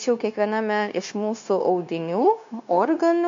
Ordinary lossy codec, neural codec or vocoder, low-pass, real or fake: MP3, 64 kbps; codec, 16 kHz, 0.9 kbps, LongCat-Audio-Codec; 7.2 kHz; fake